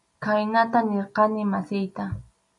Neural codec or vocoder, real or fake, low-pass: none; real; 10.8 kHz